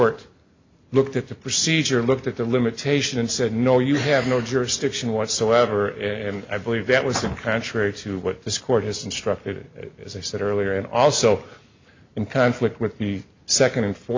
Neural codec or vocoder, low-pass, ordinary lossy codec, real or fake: none; 7.2 kHz; MP3, 64 kbps; real